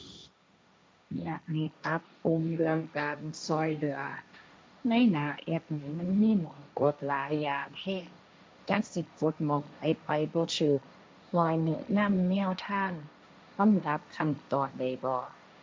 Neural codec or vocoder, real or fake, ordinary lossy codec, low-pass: codec, 16 kHz, 1.1 kbps, Voila-Tokenizer; fake; none; none